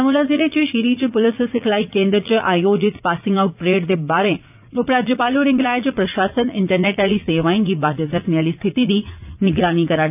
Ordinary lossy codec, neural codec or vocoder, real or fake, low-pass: none; vocoder, 44.1 kHz, 80 mel bands, Vocos; fake; 3.6 kHz